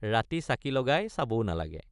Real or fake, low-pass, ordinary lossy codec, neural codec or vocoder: real; 10.8 kHz; none; none